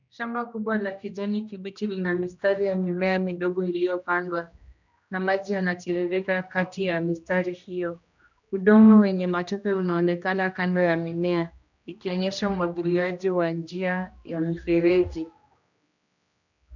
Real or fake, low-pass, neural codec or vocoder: fake; 7.2 kHz; codec, 16 kHz, 1 kbps, X-Codec, HuBERT features, trained on general audio